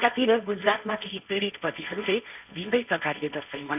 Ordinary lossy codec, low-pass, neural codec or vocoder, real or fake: none; 3.6 kHz; codec, 16 kHz, 1.1 kbps, Voila-Tokenizer; fake